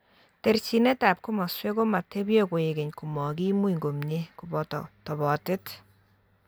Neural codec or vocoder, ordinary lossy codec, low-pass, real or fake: none; none; none; real